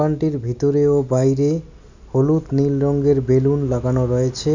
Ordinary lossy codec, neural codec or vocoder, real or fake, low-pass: none; none; real; 7.2 kHz